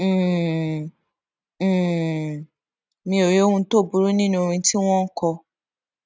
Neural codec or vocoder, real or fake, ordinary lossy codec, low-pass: none; real; none; none